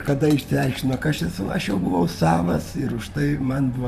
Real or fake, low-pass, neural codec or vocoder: fake; 14.4 kHz; vocoder, 44.1 kHz, 128 mel bands, Pupu-Vocoder